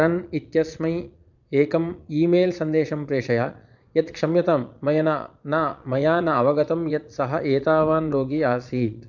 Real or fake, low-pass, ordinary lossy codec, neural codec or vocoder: fake; 7.2 kHz; none; vocoder, 22.05 kHz, 80 mel bands, Vocos